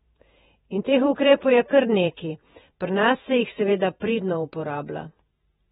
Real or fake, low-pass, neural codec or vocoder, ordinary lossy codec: fake; 19.8 kHz; vocoder, 48 kHz, 128 mel bands, Vocos; AAC, 16 kbps